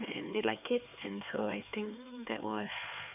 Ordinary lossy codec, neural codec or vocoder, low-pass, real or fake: none; codec, 16 kHz, 4 kbps, X-Codec, HuBERT features, trained on LibriSpeech; 3.6 kHz; fake